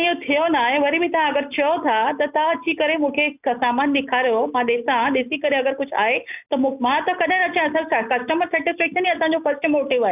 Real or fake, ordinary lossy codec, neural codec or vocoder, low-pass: real; none; none; 3.6 kHz